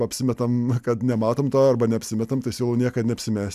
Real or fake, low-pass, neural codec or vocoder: real; 14.4 kHz; none